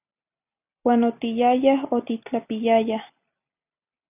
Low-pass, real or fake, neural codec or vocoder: 3.6 kHz; real; none